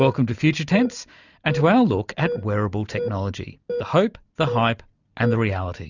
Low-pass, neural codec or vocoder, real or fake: 7.2 kHz; none; real